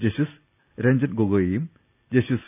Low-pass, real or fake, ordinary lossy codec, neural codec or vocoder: 3.6 kHz; real; none; none